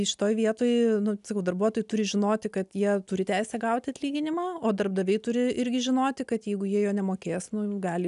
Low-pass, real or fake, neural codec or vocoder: 10.8 kHz; real; none